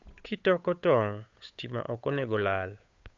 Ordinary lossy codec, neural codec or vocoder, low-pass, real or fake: AAC, 64 kbps; codec, 16 kHz, 8 kbps, FunCodec, trained on Chinese and English, 25 frames a second; 7.2 kHz; fake